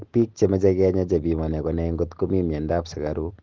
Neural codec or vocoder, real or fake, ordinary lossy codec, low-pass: none; real; Opus, 16 kbps; 7.2 kHz